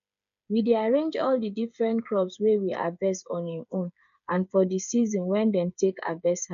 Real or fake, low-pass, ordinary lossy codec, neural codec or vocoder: fake; 7.2 kHz; none; codec, 16 kHz, 8 kbps, FreqCodec, smaller model